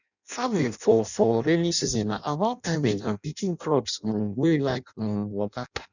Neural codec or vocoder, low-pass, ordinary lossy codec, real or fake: codec, 16 kHz in and 24 kHz out, 0.6 kbps, FireRedTTS-2 codec; 7.2 kHz; none; fake